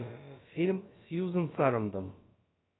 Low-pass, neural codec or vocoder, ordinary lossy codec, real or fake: 7.2 kHz; codec, 16 kHz, about 1 kbps, DyCAST, with the encoder's durations; AAC, 16 kbps; fake